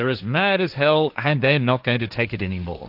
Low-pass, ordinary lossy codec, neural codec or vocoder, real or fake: 5.4 kHz; AAC, 48 kbps; codec, 16 kHz, 1.1 kbps, Voila-Tokenizer; fake